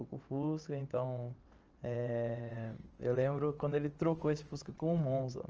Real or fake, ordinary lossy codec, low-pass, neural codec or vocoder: fake; Opus, 24 kbps; 7.2 kHz; vocoder, 22.05 kHz, 80 mel bands, WaveNeXt